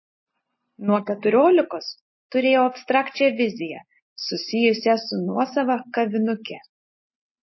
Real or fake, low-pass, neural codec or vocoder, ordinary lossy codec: real; 7.2 kHz; none; MP3, 24 kbps